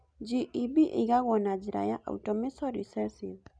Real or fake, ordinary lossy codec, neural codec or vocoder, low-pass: real; none; none; 9.9 kHz